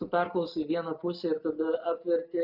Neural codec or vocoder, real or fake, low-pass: none; real; 5.4 kHz